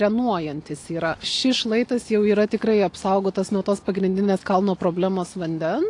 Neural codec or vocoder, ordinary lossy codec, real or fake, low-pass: none; AAC, 48 kbps; real; 10.8 kHz